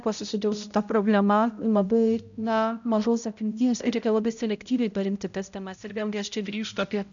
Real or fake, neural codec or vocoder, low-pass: fake; codec, 16 kHz, 0.5 kbps, X-Codec, HuBERT features, trained on balanced general audio; 7.2 kHz